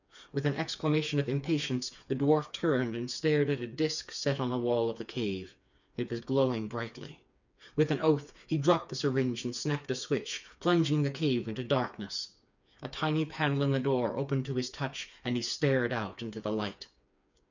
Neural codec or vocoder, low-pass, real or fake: codec, 16 kHz, 4 kbps, FreqCodec, smaller model; 7.2 kHz; fake